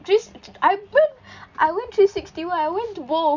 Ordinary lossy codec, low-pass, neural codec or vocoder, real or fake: none; 7.2 kHz; none; real